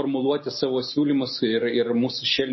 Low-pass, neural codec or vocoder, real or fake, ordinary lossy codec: 7.2 kHz; none; real; MP3, 24 kbps